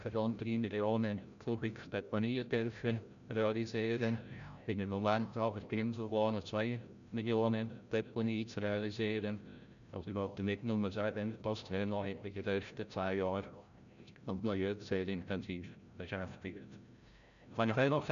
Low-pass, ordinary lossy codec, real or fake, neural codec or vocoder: 7.2 kHz; none; fake; codec, 16 kHz, 0.5 kbps, FreqCodec, larger model